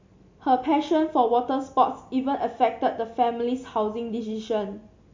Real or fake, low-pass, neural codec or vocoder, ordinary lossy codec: real; 7.2 kHz; none; MP3, 48 kbps